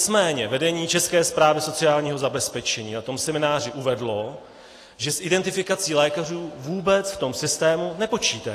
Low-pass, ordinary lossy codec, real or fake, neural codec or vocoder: 14.4 kHz; AAC, 48 kbps; real; none